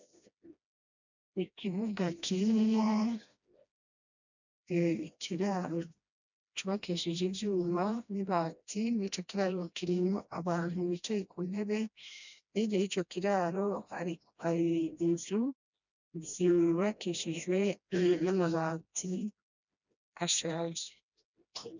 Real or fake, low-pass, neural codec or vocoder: fake; 7.2 kHz; codec, 16 kHz, 1 kbps, FreqCodec, smaller model